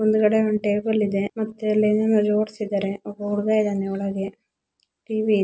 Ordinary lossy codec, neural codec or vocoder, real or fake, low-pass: none; none; real; none